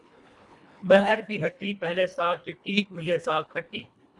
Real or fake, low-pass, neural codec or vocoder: fake; 10.8 kHz; codec, 24 kHz, 1.5 kbps, HILCodec